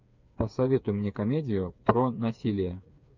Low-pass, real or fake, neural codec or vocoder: 7.2 kHz; fake; codec, 16 kHz, 4 kbps, FreqCodec, smaller model